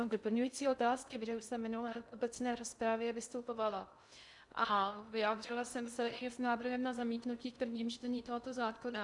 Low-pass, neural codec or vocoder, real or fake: 10.8 kHz; codec, 16 kHz in and 24 kHz out, 0.6 kbps, FocalCodec, streaming, 2048 codes; fake